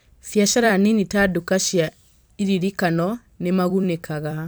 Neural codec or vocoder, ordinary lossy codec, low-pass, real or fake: vocoder, 44.1 kHz, 128 mel bands every 256 samples, BigVGAN v2; none; none; fake